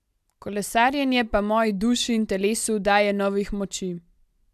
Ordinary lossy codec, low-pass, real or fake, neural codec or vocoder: none; 14.4 kHz; real; none